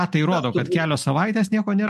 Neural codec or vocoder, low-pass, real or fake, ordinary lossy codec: none; 14.4 kHz; real; MP3, 64 kbps